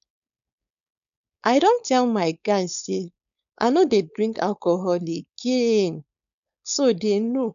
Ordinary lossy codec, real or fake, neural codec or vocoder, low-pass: none; fake; codec, 16 kHz, 4.8 kbps, FACodec; 7.2 kHz